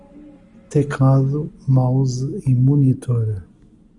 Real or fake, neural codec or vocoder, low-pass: real; none; 10.8 kHz